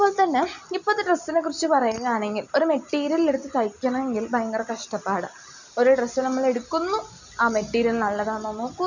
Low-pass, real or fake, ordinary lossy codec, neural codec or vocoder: 7.2 kHz; real; none; none